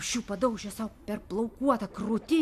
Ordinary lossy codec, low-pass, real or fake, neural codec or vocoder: MP3, 96 kbps; 14.4 kHz; real; none